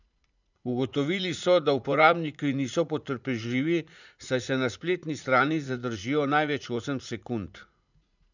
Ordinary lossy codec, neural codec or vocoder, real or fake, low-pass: none; vocoder, 44.1 kHz, 80 mel bands, Vocos; fake; 7.2 kHz